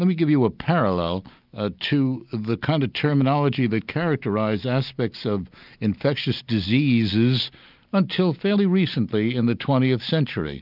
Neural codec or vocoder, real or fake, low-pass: none; real; 5.4 kHz